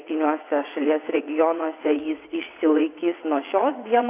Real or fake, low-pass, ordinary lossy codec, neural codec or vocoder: fake; 3.6 kHz; AAC, 24 kbps; vocoder, 22.05 kHz, 80 mel bands, WaveNeXt